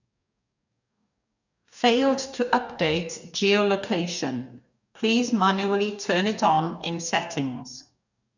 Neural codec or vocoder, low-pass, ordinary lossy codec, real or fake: codec, 44.1 kHz, 2.6 kbps, DAC; 7.2 kHz; none; fake